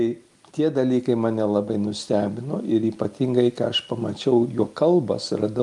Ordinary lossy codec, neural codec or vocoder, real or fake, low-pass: Opus, 32 kbps; none; real; 10.8 kHz